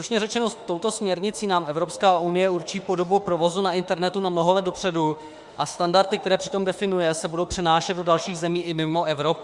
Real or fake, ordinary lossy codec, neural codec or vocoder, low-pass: fake; Opus, 64 kbps; autoencoder, 48 kHz, 32 numbers a frame, DAC-VAE, trained on Japanese speech; 10.8 kHz